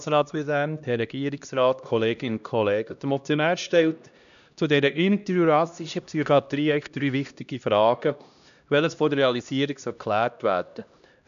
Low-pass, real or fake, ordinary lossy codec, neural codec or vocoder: 7.2 kHz; fake; none; codec, 16 kHz, 1 kbps, X-Codec, HuBERT features, trained on LibriSpeech